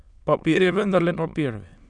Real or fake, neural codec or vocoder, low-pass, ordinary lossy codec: fake; autoencoder, 22.05 kHz, a latent of 192 numbers a frame, VITS, trained on many speakers; 9.9 kHz; none